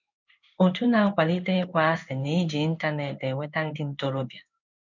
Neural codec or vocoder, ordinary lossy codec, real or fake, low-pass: codec, 16 kHz in and 24 kHz out, 1 kbps, XY-Tokenizer; none; fake; 7.2 kHz